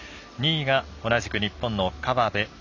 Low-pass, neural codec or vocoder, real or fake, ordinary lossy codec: 7.2 kHz; none; real; none